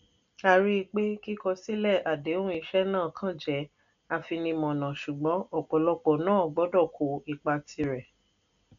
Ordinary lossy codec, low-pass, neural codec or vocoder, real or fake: MP3, 96 kbps; 7.2 kHz; none; real